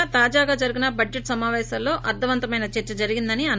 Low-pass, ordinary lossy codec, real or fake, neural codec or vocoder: none; none; real; none